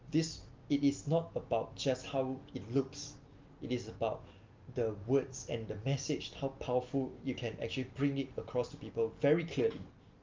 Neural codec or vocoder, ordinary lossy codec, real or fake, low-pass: none; Opus, 24 kbps; real; 7.2 kHz